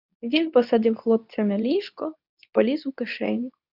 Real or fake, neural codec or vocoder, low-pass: fake; codec, 24 kHz, 0.9 kbps, WavTokenizer, medium speech release version 2; 5.4 kHz